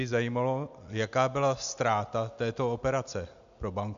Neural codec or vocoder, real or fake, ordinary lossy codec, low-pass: none; real; MP3, 64 kbps; 7.2 kHz